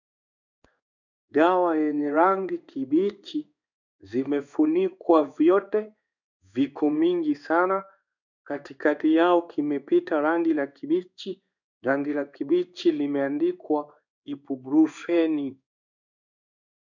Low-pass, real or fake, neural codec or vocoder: 7.2 kHz; fake; codec, 16 kHz in and 24 kHz out, 1 kbps, XY-Tokenizer